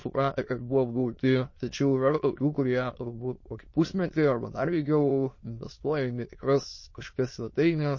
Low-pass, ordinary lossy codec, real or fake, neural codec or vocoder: 7.2 kHz; MP3, 32 kbps; fake; autoencoder, 22.05 kHz, a latent of 192 numbers a frame, VITS, trained on many speakers